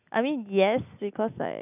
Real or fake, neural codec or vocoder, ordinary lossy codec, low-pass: real; none; none; 3.6 kHz